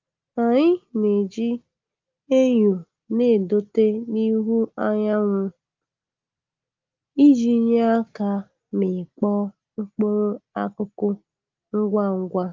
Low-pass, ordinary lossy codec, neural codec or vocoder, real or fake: 7.2 kHz; Opus, 32 kbps; none; real